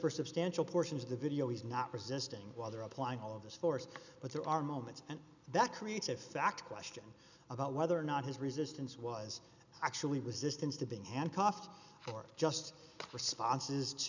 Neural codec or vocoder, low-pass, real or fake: none; 7.2 kHz; real